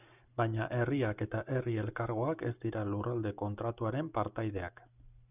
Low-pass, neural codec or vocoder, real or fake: 3.6 kHz; none; real